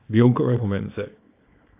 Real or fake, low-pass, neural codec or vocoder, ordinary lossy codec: fake; 3.6 kHz; codec, 24 kHz, 0.9 kbps, WavTokenizer, small release; AAC, 24 kbps